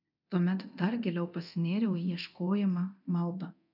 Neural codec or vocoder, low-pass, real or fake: codec, 24 kHz, 0.9 kbps, DualCodec; 5.4 kHz; fake